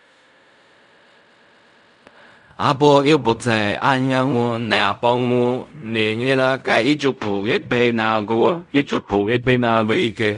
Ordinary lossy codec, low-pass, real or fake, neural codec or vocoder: none; 10.8 kHz; fake; codec, 16 kHz in and 24 kHz out, 0.4 kbps, LongCat-Audio-Codec, fine tuned four codebook decoder